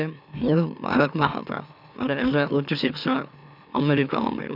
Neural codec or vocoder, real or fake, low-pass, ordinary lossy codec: autoencoder, 44.1 kHz, a latent of 192 numbers a frame, MeloTTS; fake; 5.4 kHz; none